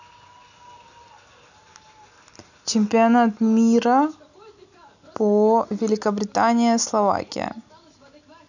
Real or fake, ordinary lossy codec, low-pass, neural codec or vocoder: real; none; 7.2 kHz; none